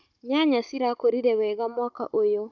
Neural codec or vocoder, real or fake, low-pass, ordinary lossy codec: codec, 16 kHz, 16 kbps, FunCodec, trained on Chinese and English, 50 frames a second; fake; 7.2 kHz; none